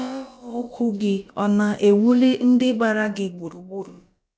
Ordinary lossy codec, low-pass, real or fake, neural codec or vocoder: none; none; fake; codec, 16 kHz, about 1 kbps, DyCAST, with the encoder's durations